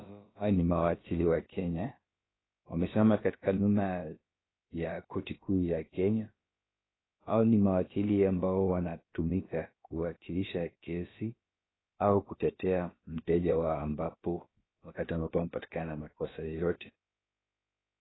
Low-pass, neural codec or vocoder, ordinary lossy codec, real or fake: 7.2 kHz; codec, 16 kHz, about 1 kbps, DyCAST, with the encoder's durations; AAC, 16 kbps; fake